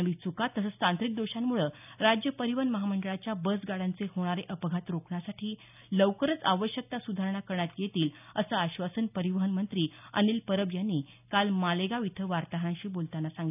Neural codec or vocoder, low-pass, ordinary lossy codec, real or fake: none; 3.6 kHz; none; real